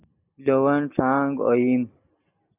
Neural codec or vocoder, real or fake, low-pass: none; real; 3.6 kHz